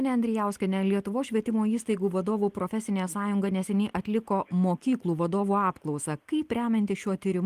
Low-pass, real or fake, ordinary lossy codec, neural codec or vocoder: 14.4 kHz; real; Opus, 24 kbps; none